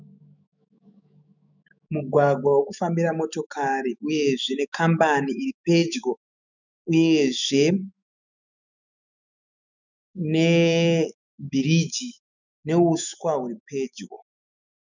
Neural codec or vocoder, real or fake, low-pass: autoencoder, 48 kHz, 128 numbers a frame, DAC-VAE, trained on Japanese speech; fake; 7.2 kHz